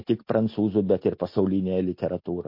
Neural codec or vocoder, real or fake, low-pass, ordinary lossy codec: none; real; 5.4 kHz; MP3, 32 kbps